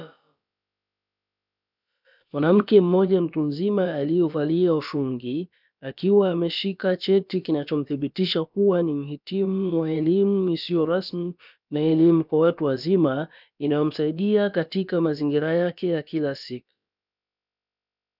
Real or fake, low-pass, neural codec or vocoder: fake; 5.4 kHz; codec, 16 kHz, about 1 kbps, DyCAST, with the encoder's durations